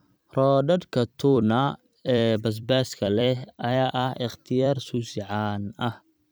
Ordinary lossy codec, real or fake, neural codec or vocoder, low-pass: none; fake; vocoder, 44.1 kHz, 128 mel bands every 256 samples, BigVGAN v2; none